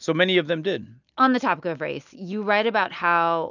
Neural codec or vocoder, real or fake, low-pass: none; real; 7.2 kHz